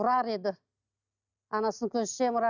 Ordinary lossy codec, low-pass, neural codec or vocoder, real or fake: none; 7.2 kHz; none; real